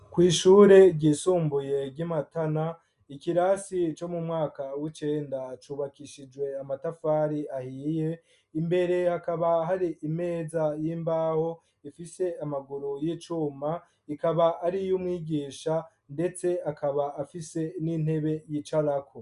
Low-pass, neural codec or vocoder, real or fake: 10.8 kHz; none; real